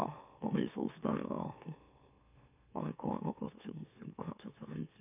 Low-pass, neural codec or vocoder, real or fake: 3.6 kHz; autoencoder, 44.1 kHz, a latent of 192 numbers a frame, MeloTTS; fake